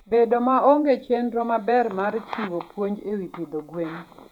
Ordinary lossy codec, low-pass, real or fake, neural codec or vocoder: none; 19.8 kHz; fake; vocoder, 48 kHz, 128 mel bands, Vocos